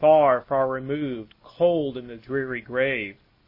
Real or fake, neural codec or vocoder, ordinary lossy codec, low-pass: real; none; MP3, 24 kbps; 5.4 kHz